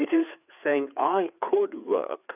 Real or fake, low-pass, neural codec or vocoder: fake; 3.6 kHz; codec, 16 kHz, 4 kbps, FreqCodec, larger model